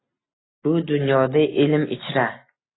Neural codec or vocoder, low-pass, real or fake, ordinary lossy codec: none; 7.2 kHz; real; AAC, 16 kbps